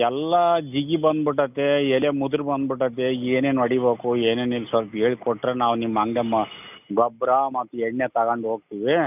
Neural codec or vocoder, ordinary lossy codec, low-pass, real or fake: none; none; 3.6 kHz; real